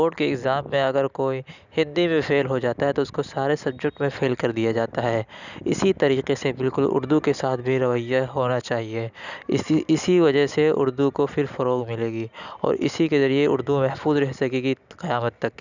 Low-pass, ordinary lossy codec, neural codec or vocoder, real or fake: 7.2 kHz; none; none; real